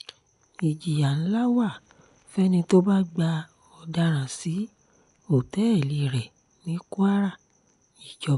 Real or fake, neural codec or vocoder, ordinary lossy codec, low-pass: real; none; none; 10.8 kHz